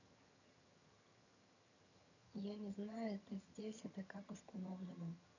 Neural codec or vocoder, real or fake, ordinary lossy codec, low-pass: vocoder, 22.05 kHz, 80 mel bands, HiFi-GAN; fake; none; 7.2 kHz